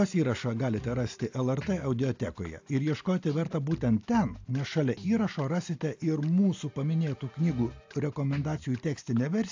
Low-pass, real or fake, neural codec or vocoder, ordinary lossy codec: 7.2 kHz; real; none; AAC, 48 kbps